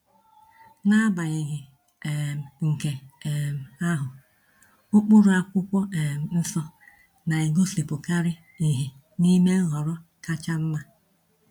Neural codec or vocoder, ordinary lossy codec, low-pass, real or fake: none; none; 19.8 kHz; real